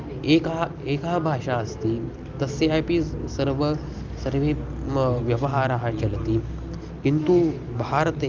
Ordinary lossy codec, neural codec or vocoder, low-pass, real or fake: Opus, 24 kbps; none; 7.2 kHz; real